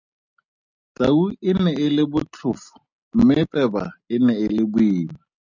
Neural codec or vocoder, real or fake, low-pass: none; real; 7.2 kHz